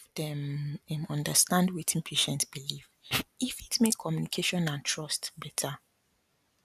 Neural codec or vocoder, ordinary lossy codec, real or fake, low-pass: none; none; real; 14.4 kHz